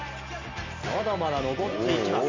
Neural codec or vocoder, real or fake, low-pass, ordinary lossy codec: none; real; 7.2 kHz; MP3, 64 kbps